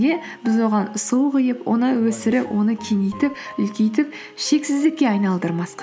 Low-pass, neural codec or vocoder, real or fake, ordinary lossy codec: none; none; real; none